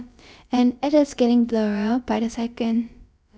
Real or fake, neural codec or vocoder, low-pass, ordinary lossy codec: fake; codec, 16 kHz, about 1 kbps, DyCAST, with the encoder's durations; none; none